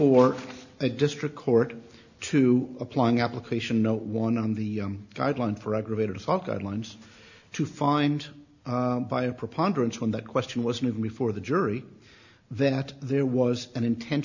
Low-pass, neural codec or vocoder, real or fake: 7.2 kHz; none; real